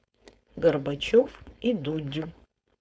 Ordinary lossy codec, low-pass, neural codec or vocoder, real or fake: none; none; codec, 16 kHz, 4.8 kbps, FACodec; fake